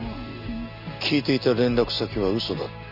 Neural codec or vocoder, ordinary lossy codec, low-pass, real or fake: none; none; 5.4 kHz; real